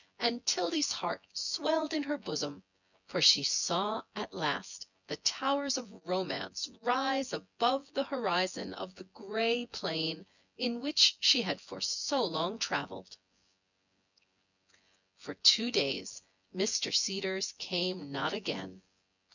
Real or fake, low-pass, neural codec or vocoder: fake; 7.2 kHz; vocoder, 24 kHz, 100 mel bands, Vocos